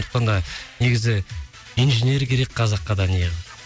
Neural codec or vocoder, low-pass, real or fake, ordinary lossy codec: none; none; real; none